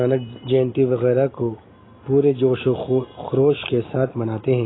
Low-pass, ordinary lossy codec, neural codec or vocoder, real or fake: 7.2 kHz; AAC, 16 kbps; none; real